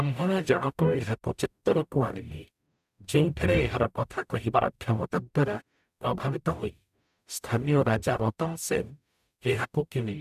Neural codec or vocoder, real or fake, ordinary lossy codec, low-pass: codec, 44.1 kHz, 0.9 kbps, DAC; fake; none; 14.4 kHz